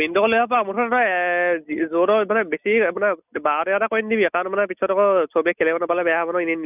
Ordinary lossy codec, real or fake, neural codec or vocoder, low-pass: none; real; none; 3.6 kHz